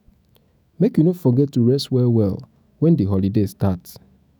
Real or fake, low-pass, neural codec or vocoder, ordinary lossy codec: fake; none; autoencoder, 48 kHz, 128 numbers a frame, DAC-VAE, trained on Japanese speech; none